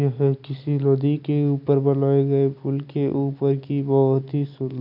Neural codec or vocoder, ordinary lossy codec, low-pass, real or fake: none; none; 5.4 kHz; real